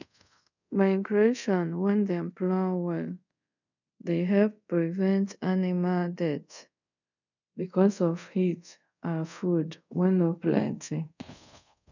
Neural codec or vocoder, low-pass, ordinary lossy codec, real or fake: codec, 24 kHz, 0.5 kbps, DualCodec; 7.2 kHz; none; fake